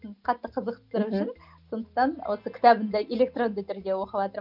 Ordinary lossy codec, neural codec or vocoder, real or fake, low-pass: none; none; real; 5.4 kHz